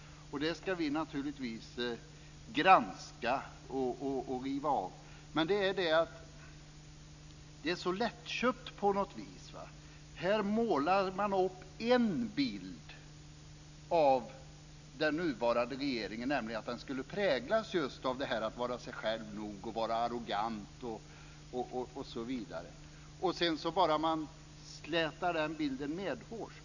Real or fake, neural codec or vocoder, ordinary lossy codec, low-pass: real; none; none; 7.2 kHz